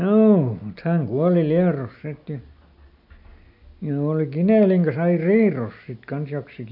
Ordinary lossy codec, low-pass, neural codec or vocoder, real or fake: AAC, 48 kbps; 5.4 kHz; none; real